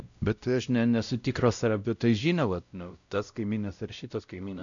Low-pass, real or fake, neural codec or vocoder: 7.2 kHz; fake; codec, 16 kHz, 0.5 kbps, X-Codec, WavLM features, trained on Multilingual LibriSpeech